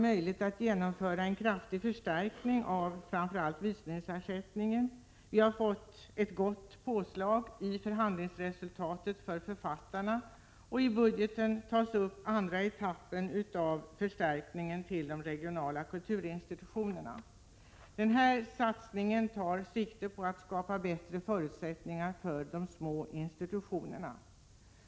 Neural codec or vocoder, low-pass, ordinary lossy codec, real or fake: none; none; none; real